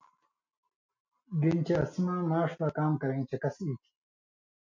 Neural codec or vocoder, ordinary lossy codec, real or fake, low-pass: none; MP3, 48 kbps; real; 7.2 kHz